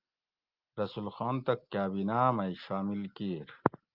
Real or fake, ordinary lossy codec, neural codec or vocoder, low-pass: real; Opus, 32 kbps; none; 5.4 kHz